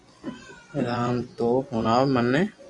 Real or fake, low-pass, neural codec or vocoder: fake; 10.8 kHz; vocoder, 24 kHz, 100 mel bands, Vocos